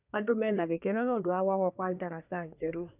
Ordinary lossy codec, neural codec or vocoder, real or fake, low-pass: none; codec, 24 kHz, 1 kbps, SNAC; fake; 3.6 kHz